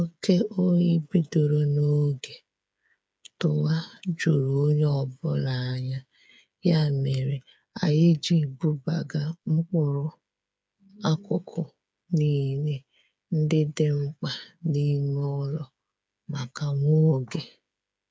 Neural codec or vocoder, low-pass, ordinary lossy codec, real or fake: codec, 16 kHz, 8 kbps, FreqCodec, smaller model; none; none; fake